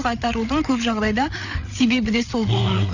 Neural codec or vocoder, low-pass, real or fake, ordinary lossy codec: codec, 16 kHz, 8 kbps, FreqCodec, larger model; 7.2 kHz; fake; none